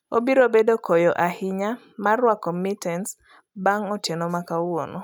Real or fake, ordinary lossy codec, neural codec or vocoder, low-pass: real; none; none; none